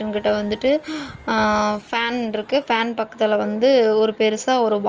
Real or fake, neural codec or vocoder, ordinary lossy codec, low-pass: real; none; Opus, 24 kbps; 7.2 kHz